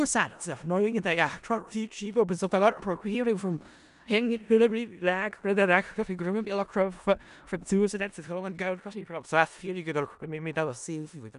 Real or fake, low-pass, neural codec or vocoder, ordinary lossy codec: fake; 10.8 kHz; codec, 16 kHz in and 24 kHz out, 0.4 kbps, LongCat-Audio-Codec, four codebook decoder; none